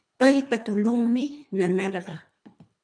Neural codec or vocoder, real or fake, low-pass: codec, 24 kHz, 1.5 kbps, HILCodec; fake; 9.9 kHz